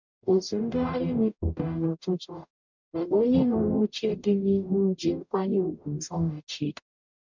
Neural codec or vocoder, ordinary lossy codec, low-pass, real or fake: codec, 44.1 kHz, 0.9 kbps, DAC; none; 7.2 kHz; fake